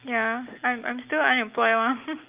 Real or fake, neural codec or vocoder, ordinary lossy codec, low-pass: real; none; Opus, 24 kbps; 3.6 kHz